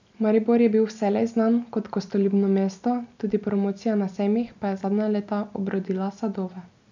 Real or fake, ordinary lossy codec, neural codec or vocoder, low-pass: real; none; none; 7.2 kHz